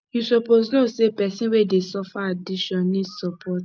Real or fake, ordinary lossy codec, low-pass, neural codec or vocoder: real; none; none; none